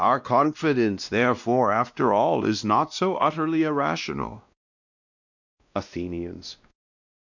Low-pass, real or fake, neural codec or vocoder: 7.2 kHz; fake; codec, 16 kHz, 1 kbps, X-Codec, WavLM features, trained on Multilingual LibriSpeech